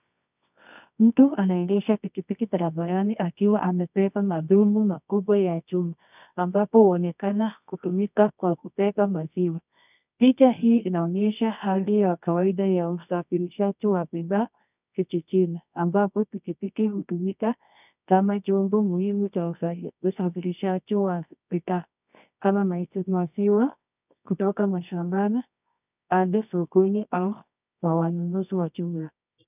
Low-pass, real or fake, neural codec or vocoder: 3.6 kHz; fake; codec, 24 kHz, 0.9 kbps, WavTokenizer, medium music audio release